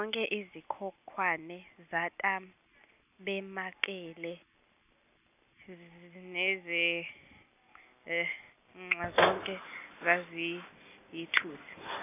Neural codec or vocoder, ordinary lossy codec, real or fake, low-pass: none; none; real; 3.6 kHz